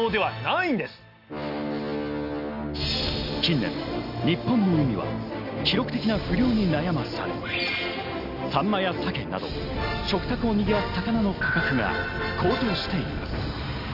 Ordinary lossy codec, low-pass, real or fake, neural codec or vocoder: none; 5.4 kHz; real; none